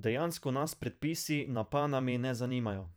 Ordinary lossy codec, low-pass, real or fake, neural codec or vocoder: none; none; fake; vocoder, 44.1 kHz, 128 mel bands every 512 samples, BigVGAN v2